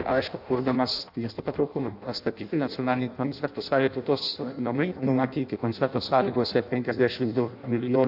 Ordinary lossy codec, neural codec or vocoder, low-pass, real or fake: AAC, 48 kbps; codec, 16 kHz in and 24 kHz out, 0.6 kbps, FireRedTTS-2 codec; 5.4 kHz; fake